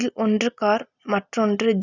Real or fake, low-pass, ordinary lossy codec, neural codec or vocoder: real; 7.2 kHz; none; none